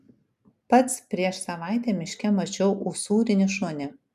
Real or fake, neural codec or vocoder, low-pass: real; none; 14.4 kHz